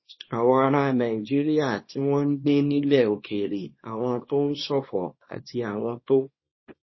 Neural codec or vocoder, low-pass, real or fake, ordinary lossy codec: codec, 24 kHz, 0.9 kbps, WavTokenizer, small release; 7.2 kHz; fake; MP3, 24 kbps